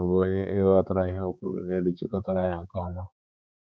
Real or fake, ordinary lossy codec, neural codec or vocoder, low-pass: fake; none; codec, 16 kHz, 4 kbps, X-Codec, HuBERT features, trained on balanced general audio; none